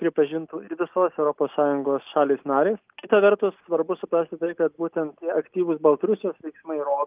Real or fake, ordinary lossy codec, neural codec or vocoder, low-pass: real; Opus, 24 kbps; none; 3.6 kHz